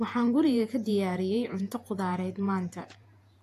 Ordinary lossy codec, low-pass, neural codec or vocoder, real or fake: AAC, 64 kbps; 14.4 kHz; vocoder, 48 kHz, 128 mel bands, Vocos; fake